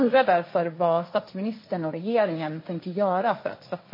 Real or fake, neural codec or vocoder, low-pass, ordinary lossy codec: fake; codec, 16 kHz, 1.1 kbps, Voila-Tokenizer; 5.4 kHz; MP3, 24 kbps